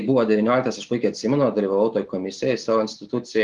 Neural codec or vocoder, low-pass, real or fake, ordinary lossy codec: none; 10.8 kHz; real; Opus, 24 kbps